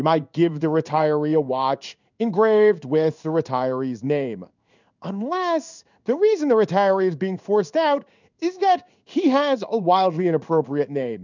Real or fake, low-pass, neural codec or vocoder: fake; 7.2 kHz; codec, 16 kHz in and 24 kHz out, 1 kbps, XY-Tokenizer